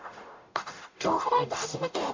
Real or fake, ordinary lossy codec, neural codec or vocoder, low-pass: fake; MP3, 48 kbps; codec, 44.1 kHz, 0.9 kbps, DAC; 7.2 kHz